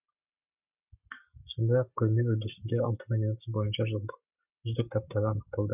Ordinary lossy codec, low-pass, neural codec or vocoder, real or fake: none; 3.6 kHz; codec, 44.1 kHz, 7.8 kbps, Pupu-Codec; fake